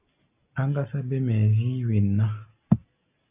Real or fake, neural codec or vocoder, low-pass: real; none; 3.6 kHz